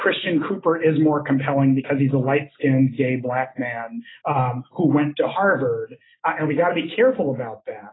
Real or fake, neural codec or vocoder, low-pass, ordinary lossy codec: real; none; 7.2 kHz; AAC, 16 kbps